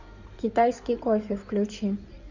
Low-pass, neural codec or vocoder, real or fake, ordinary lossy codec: 7.2 kHz; codec, 16 kHz in and 24 kHz out, 2.2 kbps, FireRedTTS-2 codec; fake; Opus, 64 kbps